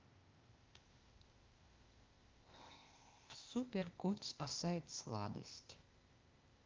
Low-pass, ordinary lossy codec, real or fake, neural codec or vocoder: 7.2 kHz; Opus, 24 kbps; fake; codec, 16 kHz, 0.8 kbps, ZipCodec